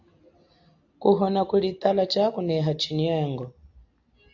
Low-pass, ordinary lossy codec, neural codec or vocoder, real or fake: 7.2 kHz; AAC, 48 kbps; none; real